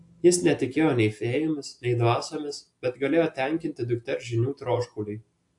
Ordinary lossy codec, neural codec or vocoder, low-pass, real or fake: AAC, 64 kbps; none; 10.8 kHz; real